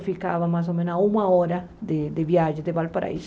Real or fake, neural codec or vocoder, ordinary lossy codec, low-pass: real; none; none; none